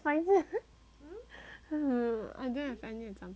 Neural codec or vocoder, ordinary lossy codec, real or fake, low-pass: none; none; real; none